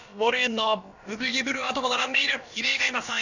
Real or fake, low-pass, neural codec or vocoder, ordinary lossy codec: fake; 7.2 kHz; codec, 16 kHz, about 1 kbps, DyCAST, with the encoder's durations; none